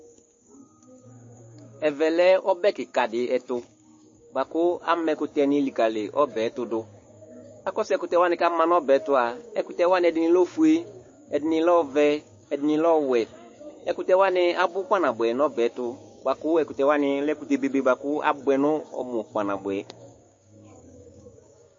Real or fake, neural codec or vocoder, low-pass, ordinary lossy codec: fake; codec, 16 kHz, 6 kbps, DAC; 7.2 kHz; MP3, 32 kbps